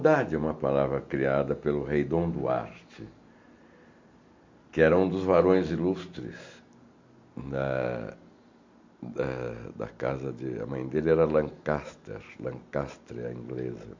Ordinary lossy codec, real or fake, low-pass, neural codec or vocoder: MP3, 64 kbps; real; 7.2 kHz; none